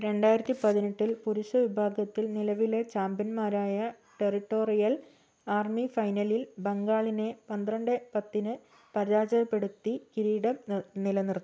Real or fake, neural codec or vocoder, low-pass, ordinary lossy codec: real; none; none; none